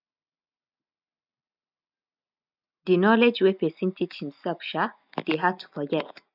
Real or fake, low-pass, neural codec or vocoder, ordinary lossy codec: real; 5.4 kHz; none; none